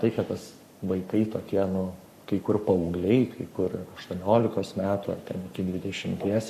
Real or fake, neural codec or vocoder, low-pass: fake; codec, 44.1 kHz, 7.8 kbps, Pupu-Codec; 14.4 kHz